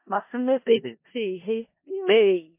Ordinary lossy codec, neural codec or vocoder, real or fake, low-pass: MP3, 24 kbps; codec, 16 kHz in and 24 kHz out, 0.4 kbps, LongCat-Audio-Codec, four codebook decoder; fake; 3.6 kHz